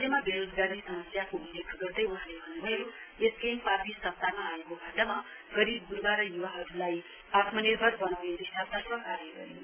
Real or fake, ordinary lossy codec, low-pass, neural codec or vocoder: real; AAC, 24 kbps; 3.6 kHz; none